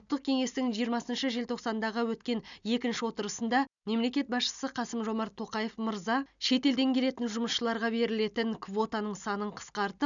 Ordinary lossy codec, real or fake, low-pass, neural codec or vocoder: none; real; 7.2 kHz; none